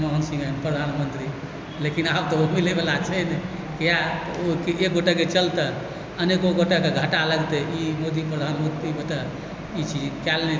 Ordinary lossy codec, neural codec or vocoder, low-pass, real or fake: none; none; none; real